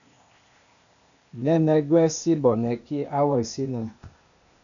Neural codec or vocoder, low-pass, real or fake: codec, 16 kHz, 0.8 kbps, ZipCodec; 7.2 kHz; fake